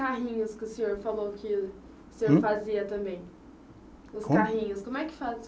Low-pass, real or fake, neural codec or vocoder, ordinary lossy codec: none; real; none; none